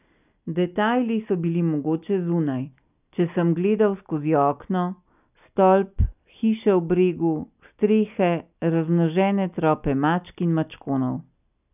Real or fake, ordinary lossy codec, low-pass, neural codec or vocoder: real; none; 3.6 kHz; none